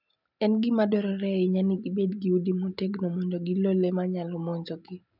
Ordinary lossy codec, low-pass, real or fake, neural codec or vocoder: none; 5.4 kHz; real; none